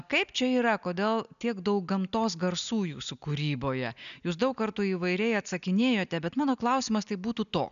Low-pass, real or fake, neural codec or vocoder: 7.2 kHz; real; none